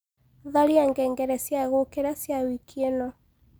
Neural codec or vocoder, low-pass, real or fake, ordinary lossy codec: none; none; real; none